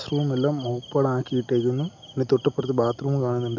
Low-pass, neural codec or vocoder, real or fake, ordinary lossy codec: 7.2 kHz; none; real; MP3, 64 kbps